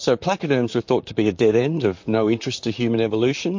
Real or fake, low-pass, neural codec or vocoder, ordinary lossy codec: fake; 7.2 kHz; vocoder, 44.1 kHz, 128 mel bands, Pupu-Vocoder; MP3, 48 kbps